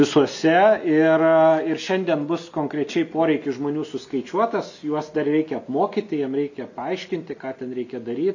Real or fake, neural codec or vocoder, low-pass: real; none; 7.2 kHz